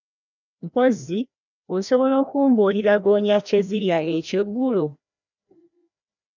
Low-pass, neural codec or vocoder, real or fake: 7.2 kHz; codec, 16 kHz, 1 kbps, FreqCodec, larger model; fake